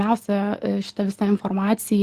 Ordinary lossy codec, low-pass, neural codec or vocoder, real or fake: Opus, 16 kbps; 14.4 kHz; none; real